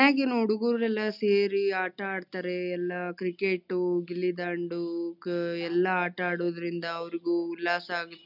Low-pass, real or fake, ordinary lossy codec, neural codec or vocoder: 5.4 kHz; real; none; none